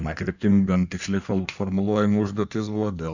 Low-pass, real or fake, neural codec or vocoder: 7.2 kHz; fake; codec, 16 kHz in and 24 kHz out, 1.1 kbps, FireRedTTS-2 codec